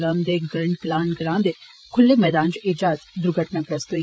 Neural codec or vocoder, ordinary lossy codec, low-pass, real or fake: codec, 16 kHz, 16 kbps, FreqCodec, larger model; none; none; fake